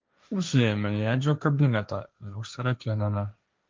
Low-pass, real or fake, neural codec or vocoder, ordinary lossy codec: 7.2 kHz; fake; codec, 16 kHz, 1.1 kbps, Voila-Tokenizer; Opus, 24 kbps